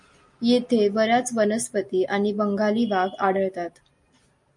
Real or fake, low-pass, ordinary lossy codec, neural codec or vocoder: real; 10.8 kHz; MP3, 48 kbps; none